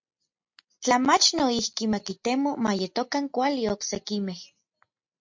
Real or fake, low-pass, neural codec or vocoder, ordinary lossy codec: real; 7.2 kHz; none; MP3, 48 kbps